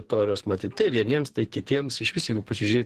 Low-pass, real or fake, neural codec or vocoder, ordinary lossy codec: 14.4 kHz; fake; codec, 44.1 kHz, 2.6 kbps, SNAC; Opus, 16 kbps